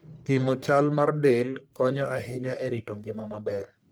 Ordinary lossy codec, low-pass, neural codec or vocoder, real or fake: none; none; codec, 44.1 kHz, 1.7 kbps, Pupu-Codec; fake